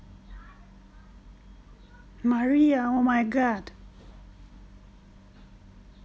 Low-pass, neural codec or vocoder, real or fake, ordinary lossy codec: none; none; real; none